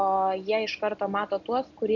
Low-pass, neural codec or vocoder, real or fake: 7.2 kHz; none; real